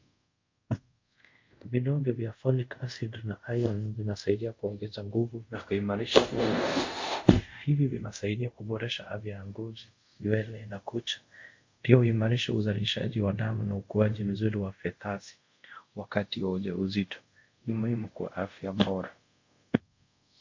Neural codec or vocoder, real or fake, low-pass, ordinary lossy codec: codec, 24 kHz, 0.5 kbps, DualCodec; fake; 7.2 kHz; MP3, 48 kbps